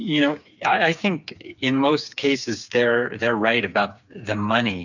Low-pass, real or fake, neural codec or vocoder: 7.2 kHz; fake; codec, 16 kHz, 4 kbps, FreqCodec, smaller model